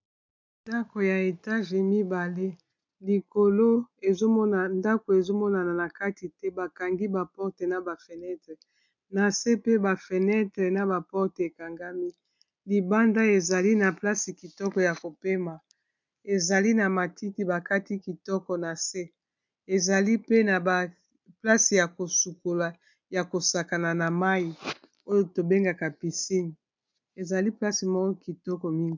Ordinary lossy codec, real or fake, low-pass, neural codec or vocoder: MP3, 64 kbps; real; 7.2 kHz; none